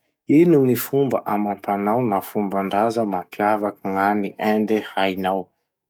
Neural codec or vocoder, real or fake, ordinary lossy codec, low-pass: codec, 44.1 kHz, 7.8 kbps, DAC; fake; none; 19.8 kHz